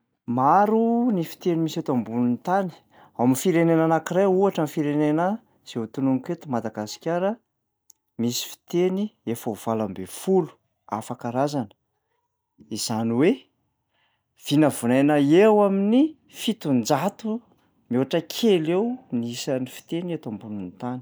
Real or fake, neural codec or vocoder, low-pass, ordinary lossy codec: real; none; none; none